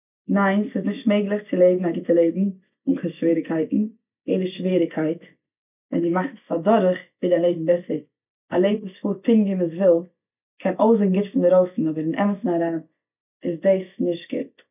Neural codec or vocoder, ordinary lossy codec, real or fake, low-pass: none; none; real; 3.6 kHz